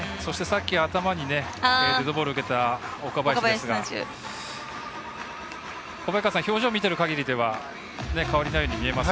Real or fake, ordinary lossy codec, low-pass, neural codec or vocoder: real; none; none; none